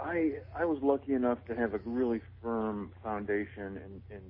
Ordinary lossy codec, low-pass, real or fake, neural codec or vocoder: MP3, 24 kbps; 5.4 kHz; real; none